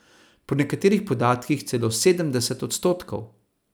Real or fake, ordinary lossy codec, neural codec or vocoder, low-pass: real; none; none; none